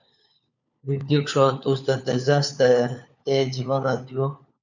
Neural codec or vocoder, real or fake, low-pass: codec, 16 kHz, 4 kbps, FunCodec, trained on LibriTTS, 50 frames a second; fake; 7.2 kHz